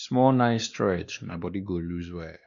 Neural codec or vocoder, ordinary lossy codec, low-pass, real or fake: codec, 16 kHz, 2 kbps, X-Codec, WavLM features, trained on Multilingual LibriSpeech; Opus, 64 kbps; 7.2 kHz; fake